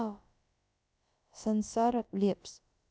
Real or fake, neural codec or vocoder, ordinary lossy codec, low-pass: fake; codec, 16 kHz, about 1 kbps, DyCAST, with the encoder's durations; none; none